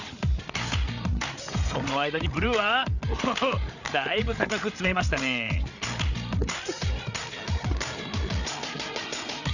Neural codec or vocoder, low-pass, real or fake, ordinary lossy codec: codec, 16 kHz, 8 kbps, FreqCodec, larger model; 7.2 kHz; fake; none